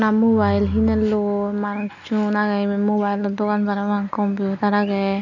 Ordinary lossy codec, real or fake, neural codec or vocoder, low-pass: none; real; none; 7.2 kHz